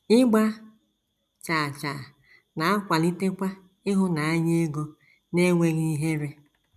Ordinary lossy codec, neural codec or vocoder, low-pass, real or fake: none; none; 14.4 kHz; real